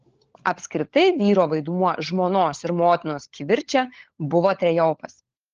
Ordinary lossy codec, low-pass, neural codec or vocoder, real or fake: Opus, 16 kbps; 7.2 kHz; codec, 16 kHz, 16 kbps, FunCodec, trained on LibriTTS, 50 frames a second; fake